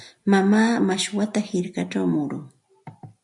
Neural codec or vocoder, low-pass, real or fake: none; 10.8 kHz; real